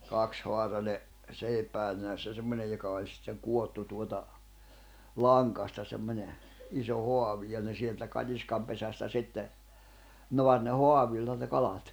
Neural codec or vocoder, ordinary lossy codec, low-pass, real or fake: none; none; none; real